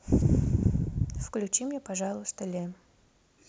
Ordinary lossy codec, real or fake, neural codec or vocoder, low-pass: none; real; none; none